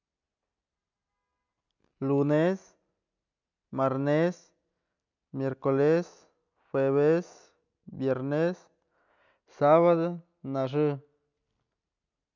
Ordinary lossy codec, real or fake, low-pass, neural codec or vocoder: none; real; 7.2 kHz; none